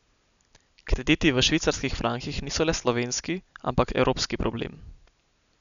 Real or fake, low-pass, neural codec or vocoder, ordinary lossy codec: real; 7.2 kHz; none; none